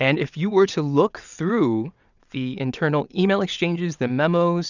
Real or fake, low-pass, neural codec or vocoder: fake; 7.2 kHz; vocoder, 22.05 kHz, 80 mel bands, WaveNeXt